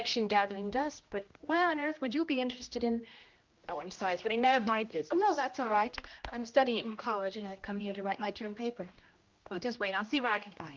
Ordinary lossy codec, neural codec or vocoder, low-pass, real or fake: Opus, 32 kbps; codec, 16 kHz, 1 kbps, X-Codec, HuBERT features, trained on general audio; 7.2 kHz; fake